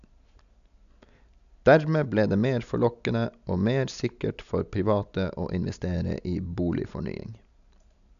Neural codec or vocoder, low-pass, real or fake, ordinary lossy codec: none; 7.2 kHz; real; none